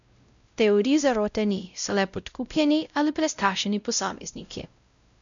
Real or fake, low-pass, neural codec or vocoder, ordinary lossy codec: fake; 7.2 kHz; codec, 16 kHz, 0.5 kbps, X-Codec, WavLM features, trained on Multilingual LibriSpeech; none